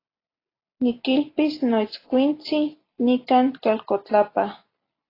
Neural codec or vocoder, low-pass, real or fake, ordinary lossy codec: none; 5.4 kHz; real; AAC, 24 kbps